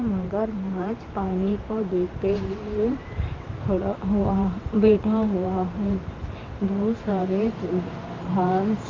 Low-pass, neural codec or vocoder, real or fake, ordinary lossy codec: 7.2 kHz; vocoder, 44.1 kHz, 128 mel bands every 512 samples, BigVGAN v2; fake; Opus, 24 kbps